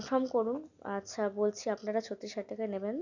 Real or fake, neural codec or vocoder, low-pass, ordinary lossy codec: real; none; 7.2 kHz; none